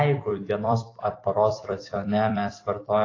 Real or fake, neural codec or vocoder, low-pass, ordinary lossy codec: fake; vocoder, 44.1 kHz, 128 mel bands every 256 samples, BigVGAN v2; 7.2 kHz; AAC, 32 kbps